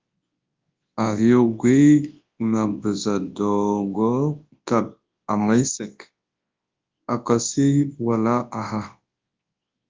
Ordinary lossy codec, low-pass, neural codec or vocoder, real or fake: Opus, 32 kbps; 7.2 kHz; codec, 24 kHz, 0.9 kbps, WavTokenizer, large speech release; fake